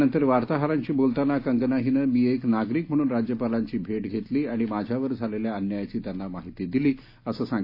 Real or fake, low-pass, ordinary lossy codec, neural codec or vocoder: real; 5.4 kHz; AAC, 32 kbps; none